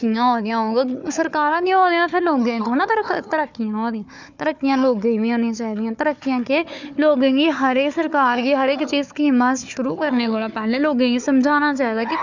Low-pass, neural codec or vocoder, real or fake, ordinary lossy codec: 7.2 kHz; codec, 16 kHz, 4 kbps, FunCodec, trained on Chinese and English, 50 frames a second; fake; none